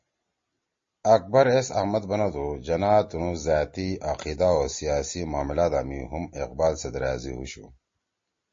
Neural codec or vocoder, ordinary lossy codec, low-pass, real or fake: none; MP3, 32 kbps; 7.2 kHz; real